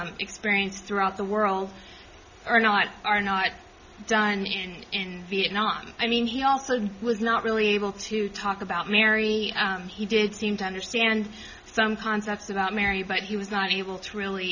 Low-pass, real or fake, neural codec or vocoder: 7.2 kHz; real; none